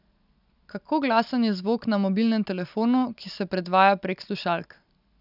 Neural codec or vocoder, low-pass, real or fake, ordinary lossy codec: none; 5.4 kHz; real; none